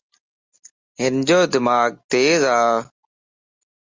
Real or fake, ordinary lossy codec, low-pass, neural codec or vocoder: real; Opus, 32 kbps; 7.2 kHz; none